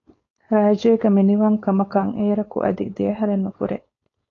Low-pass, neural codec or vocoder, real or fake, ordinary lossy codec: 7.2 kHz; codec, 16 kHz, 4.8 kbps, FACodec; fake; AAC, 32 kbps